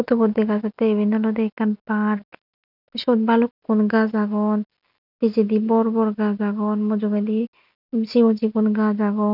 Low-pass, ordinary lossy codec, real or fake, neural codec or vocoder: 5.4 kHz; none; real; none